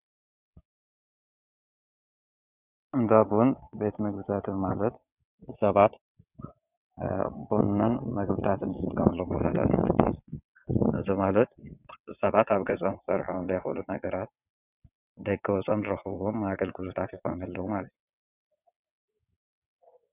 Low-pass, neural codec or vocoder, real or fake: 3.6 kHz; vocoder, 22.05 kHz, 80 mel bands, WaveNeXt; fake